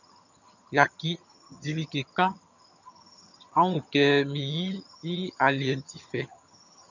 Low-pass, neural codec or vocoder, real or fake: 7.2 kHz; vocoder, 22.05 kHz, 80 mel bands, HiFi-GAN; fake